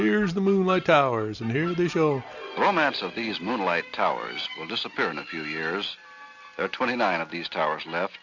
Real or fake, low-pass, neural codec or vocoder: real; 7.2 kHz; none